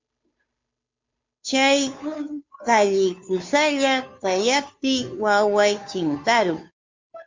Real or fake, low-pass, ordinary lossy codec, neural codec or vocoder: fake; 7.2 kHz; MP3, 48 kbps; codec, 16 kHz, 2 kbps, FunCodec, trained on Chinese and English, 25 frames a second